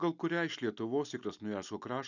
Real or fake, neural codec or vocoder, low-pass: real; none; 7.2 kHz